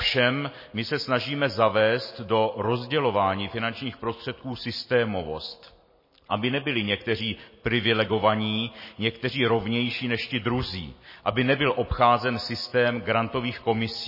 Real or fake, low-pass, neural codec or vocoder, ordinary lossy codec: real; 5.4 kHz; none; MP3, 24 kbps